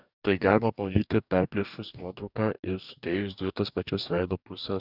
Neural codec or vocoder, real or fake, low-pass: codec, 44.1 kHz, 2.6 kbps, DAC; fake; 5.4 kHz